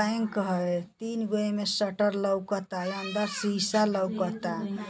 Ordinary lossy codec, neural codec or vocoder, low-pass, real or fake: none; none; none; real